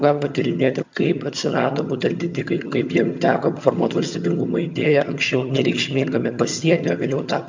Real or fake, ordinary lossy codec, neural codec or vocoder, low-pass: fake; MP3, 64 kbps; vocoder, 22.05 kHz, 80 mel bands, HiFi-GAN; 7.2 kHz